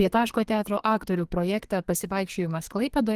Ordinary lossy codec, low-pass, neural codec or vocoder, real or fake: Opus, 24 kbps; 14.4 kHz; codec, 44.1 kHz, 2.6 kbps, SNAC; fake